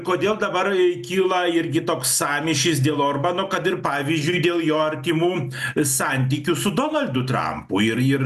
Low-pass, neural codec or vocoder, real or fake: 14.4 kHz; none; real